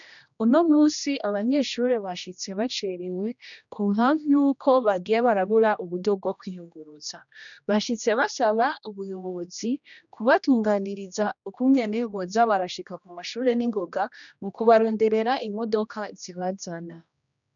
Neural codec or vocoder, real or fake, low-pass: codec, 16 kHz, 1 kbps, X-Codec, HuBERT features, trained on general audio; fake; 7.2 kHz